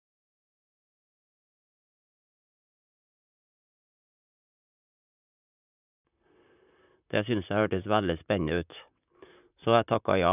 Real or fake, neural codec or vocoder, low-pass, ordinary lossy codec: fake; vocoder, 44.1 kHz, 128 mel bands, Pupu-Vocoder; 3.6 kHz; none